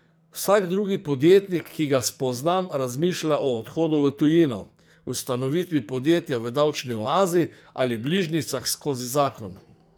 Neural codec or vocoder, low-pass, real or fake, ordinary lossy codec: codec, 44.1 kHz, 2.6 kbps, SNAC; none; fake; none